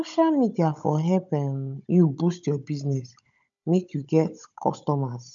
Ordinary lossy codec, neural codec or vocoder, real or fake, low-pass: none; codec, 16 kHz, 16 kbps, FunCodec, trained on Chinese and English, 50 frames a second; fake; 7.2 kHz